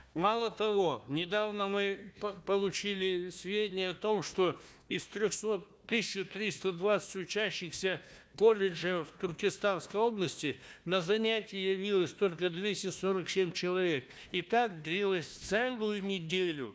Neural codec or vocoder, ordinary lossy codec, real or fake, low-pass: codec, 16 kHz, 1 kbps, FunCodec, trained on Chinese and English, 50 frames a second; none; fake; none